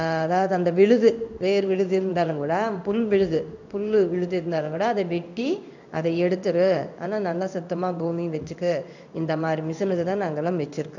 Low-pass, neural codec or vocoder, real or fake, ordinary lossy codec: 7.2 kHz; codec, 16 kHz in and 24 kHz out, 1 kbps, XY-Tokenizer; fake; none